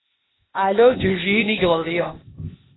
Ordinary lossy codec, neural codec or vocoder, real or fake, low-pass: AAC, 16 kbps; codec, 16 kHz, 0.8 kbps, ZipCodec; fake; 7.2 kHz